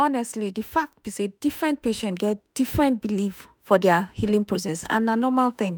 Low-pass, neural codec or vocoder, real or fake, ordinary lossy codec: none; autoencoder, 48 kHz, 32 numbers a frame, DAC-VAE, trained on Japanese speech; fake; none